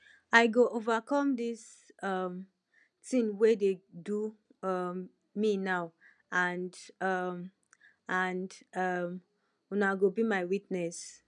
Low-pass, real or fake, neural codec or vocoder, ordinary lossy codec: 9.9 kHz; real; none; none